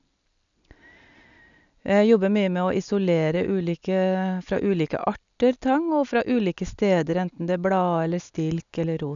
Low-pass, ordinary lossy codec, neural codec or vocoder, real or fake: 7.2 kHz; none; none; real